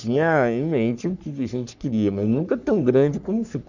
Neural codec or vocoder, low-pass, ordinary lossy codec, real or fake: codec, 44.1 kHz, 3.4 kbps, Pupu-Codec; 7.2 kHz; none; fake